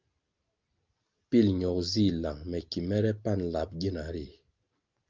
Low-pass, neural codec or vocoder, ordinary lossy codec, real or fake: 7.2 kHz; none; Opus, 32 kbps; real